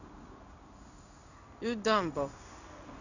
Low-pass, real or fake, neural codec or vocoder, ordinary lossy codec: 7.2 kHz; fake; codec, 16 kHz in and 24 kHz out, 1 kbps, XY-Tokenizer; none